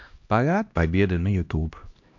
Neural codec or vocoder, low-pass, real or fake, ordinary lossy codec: codec, 16 kHz, 1 kbps, X-Codec, HuBERT features, trained on LibriSpeech; 7.2 kHz; fake; AAC, 48 kbps